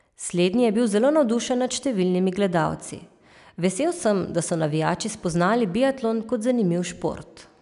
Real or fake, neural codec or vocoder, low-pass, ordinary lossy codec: real; none; 10.8 kHz; none